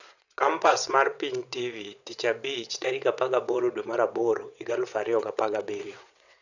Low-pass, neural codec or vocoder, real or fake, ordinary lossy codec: 7.2 kHz; vocoder, 22.05 kHz, 80 mel bands, WaveNeXt; fake; none